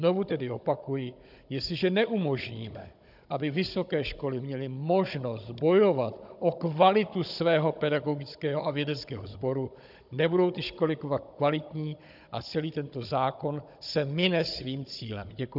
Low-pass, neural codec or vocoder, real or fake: 5.4 kHz; codec, 16 kHz, 16 kbps, FunCodec, trained on Chinese and English, 50 frames a second; fake